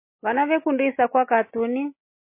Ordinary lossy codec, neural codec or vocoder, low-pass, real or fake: MP3, 24 kbps; none; 3.6 kHz; real